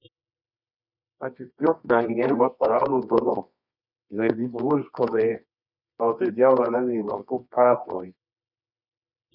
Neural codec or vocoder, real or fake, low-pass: codec, 24 kHz, 0.9 kbps, WavTokenizer, medium music audio release; fake; 5.4 kHz